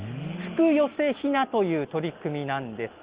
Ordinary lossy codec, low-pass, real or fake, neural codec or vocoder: Opus, 32 kbps; 3.6 kHz; real; none